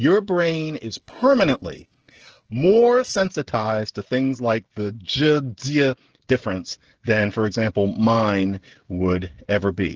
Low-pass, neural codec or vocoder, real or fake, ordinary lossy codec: 7.2 kHz; codec, 16 kHz, 16 kbps, FreqCodec, smaller model; fake; Opus, 16 kbps